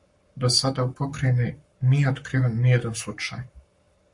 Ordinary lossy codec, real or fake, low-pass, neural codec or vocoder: MP3, 48 kbps; fake; 10.8 kHz; codec, 44.1 kHz, 7.8 kbps, Pupu-Codec